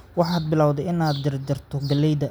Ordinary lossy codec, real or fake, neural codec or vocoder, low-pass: none; real; none; none